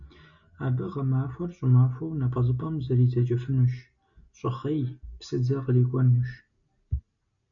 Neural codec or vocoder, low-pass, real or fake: none; 7.2 kHz; real